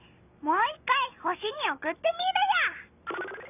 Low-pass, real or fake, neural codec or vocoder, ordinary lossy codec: 3.6 kHz; real; none; none